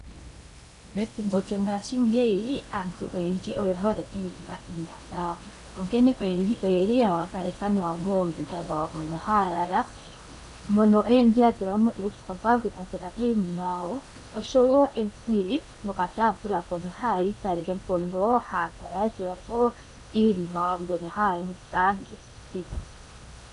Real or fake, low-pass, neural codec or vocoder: fake; 10.8 kHz; codec, 16 kHz in and 24 kHz out, 0.8 kbps, FocalCodec, streaming, 65536 codes